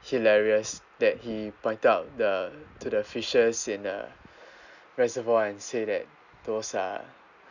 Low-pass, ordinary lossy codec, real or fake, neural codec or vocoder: 7.2 kHz; none; real; none